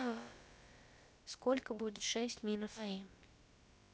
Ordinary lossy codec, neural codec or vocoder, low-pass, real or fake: none; codec, 16 kHz, about 1 kbps, DyCAST, with the encoder's durations; none; fake